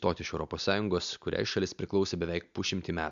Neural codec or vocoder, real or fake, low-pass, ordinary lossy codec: none; real; 7.2 kHz; MP3, 64 kbps